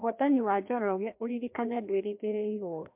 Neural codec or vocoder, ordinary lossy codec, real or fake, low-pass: codec, 16 kHz, 1 kbps, FreqCodec, larger model; MP3, 32 kbps; fake; 3.6 kHz